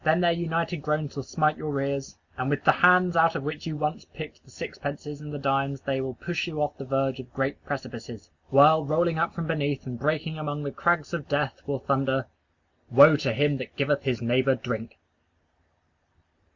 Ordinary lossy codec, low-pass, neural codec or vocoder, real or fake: Opus, 64 kbps; 7.2 kHz; none; real